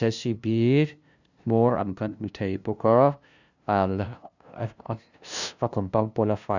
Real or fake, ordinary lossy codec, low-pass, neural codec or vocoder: fake; none; 7.2 kHz; codec, 16 kHz, 0.5 kbps, FunCodec, trained on LibriTTS, 25 frames a second